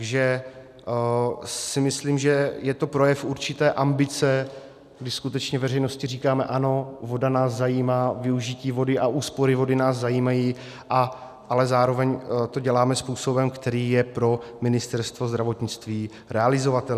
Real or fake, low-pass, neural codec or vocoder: real; 14.4 kHz; none